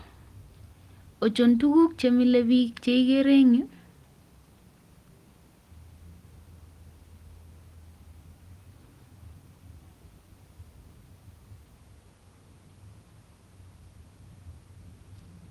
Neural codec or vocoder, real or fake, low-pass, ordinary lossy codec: none; real; 14.4 kHz; Opus, 24 kbps